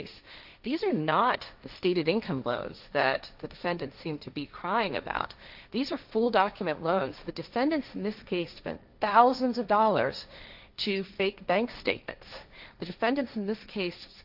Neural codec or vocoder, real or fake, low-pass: codec, 16 kHz, 1.1 kbps, Voila-Tokenizer; fake; 5.4 kHz